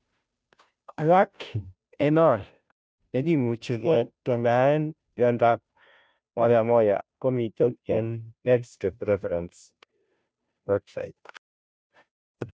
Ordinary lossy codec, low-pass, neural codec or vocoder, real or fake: none; none; codec, 16 kHz, 0.5 kbps, FunCodec, trained on Chinese and English, 25 frames a second; fake